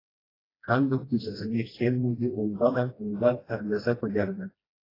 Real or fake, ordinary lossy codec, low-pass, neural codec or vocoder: fake; AAC, 24 kbps; 5.4 kHz; codec, 16 kHz, 1 kbps, FreqCodec, smaller model